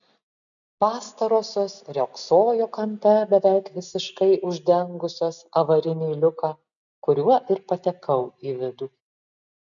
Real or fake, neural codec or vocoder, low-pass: real; none; 7.2 kHz